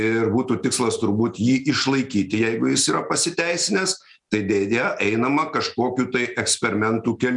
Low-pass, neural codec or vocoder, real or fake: 10.8 kHz; none; real